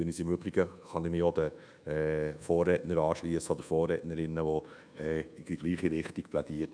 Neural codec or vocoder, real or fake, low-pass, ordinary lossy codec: codec, 24 kHz, 1.2 kbps, DualCodec; fake; 9.9 kHz; none